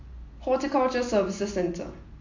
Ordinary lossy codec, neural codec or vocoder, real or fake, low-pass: AAC, 48 kbps; none; real; 7.2 kHz